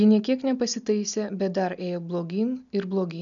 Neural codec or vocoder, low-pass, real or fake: none; 7.2 kHz; real